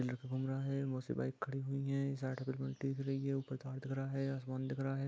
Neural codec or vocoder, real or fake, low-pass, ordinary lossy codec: none; real; none; none